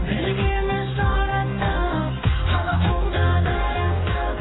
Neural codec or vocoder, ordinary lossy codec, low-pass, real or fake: codec, 44.1 kHz, 2.6 kbps, DAC; AAC, 16 kbps; 7.2 kHz; fake